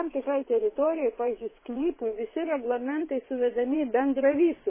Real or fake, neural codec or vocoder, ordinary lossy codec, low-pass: fake; vocoder, 22.05 kHz, 80 mel bands, WaveNeXt; MP3, 16 kbps; 3.6 kHz